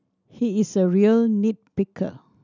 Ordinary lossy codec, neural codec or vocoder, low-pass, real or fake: none; none; 7.2 kHz; real